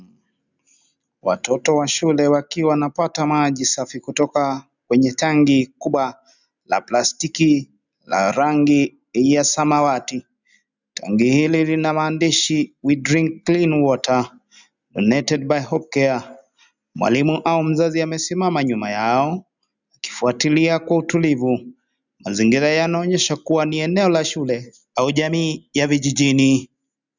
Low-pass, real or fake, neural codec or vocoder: 7.2 kHz; real; none